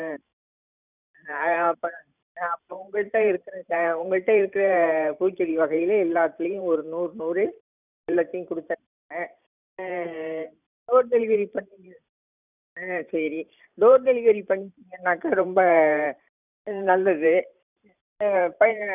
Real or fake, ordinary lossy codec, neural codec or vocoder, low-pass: fake; none; vocoder, 44.1 kHz, 128 mel bands every 512 samples, BigVGAN v2; 3.6 kHz